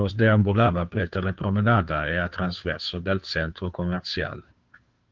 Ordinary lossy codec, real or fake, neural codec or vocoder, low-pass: Opus, 32 kbps; fake; codec, 16 kHz, 2 kbps, FunCodec, trained on Chinese and English, 25 frames a second; 7.2 kHz